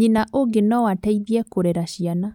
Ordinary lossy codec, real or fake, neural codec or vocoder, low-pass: none; real; none; 19.8 kHz